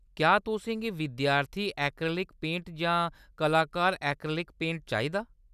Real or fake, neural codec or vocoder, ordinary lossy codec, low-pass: real; none; none; 14.4 kHz